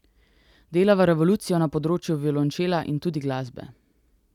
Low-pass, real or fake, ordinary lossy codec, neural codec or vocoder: 19.8 kHz; real; none; none